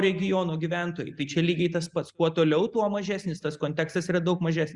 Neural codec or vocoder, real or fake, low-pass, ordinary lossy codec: none; real; 10.8 kHz; Opus, 64 kbps